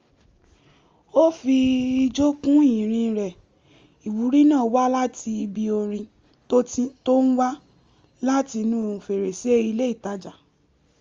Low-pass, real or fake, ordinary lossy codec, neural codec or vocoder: 7.2 kHz; real; Opus, 32 kbps; none